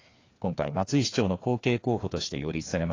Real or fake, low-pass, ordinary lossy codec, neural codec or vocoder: fake; 7.2 kHz; AAC, 32 kbps; codec, 16 kHz, 2 kbps, FreqCodec, larger model